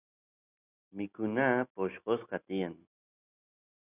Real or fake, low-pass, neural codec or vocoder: real; 3.6 kHz; none